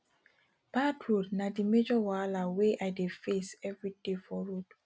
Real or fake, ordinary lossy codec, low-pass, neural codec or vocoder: real; none; none; none